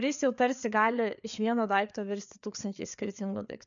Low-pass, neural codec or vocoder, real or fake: 7.2 kHz; codec, 16 kHz, 4 kbps, FreqCodec, larger model; fake